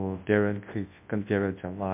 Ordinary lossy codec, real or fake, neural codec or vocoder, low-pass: MP3, 32 kbps; fake; codec, 24 kHz, 0.9 kbps, WavTokenizer, large speech release; 3.6 kHz